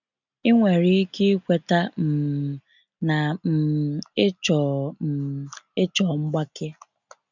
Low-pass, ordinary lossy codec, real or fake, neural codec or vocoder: 7.2 kHz; none; real; none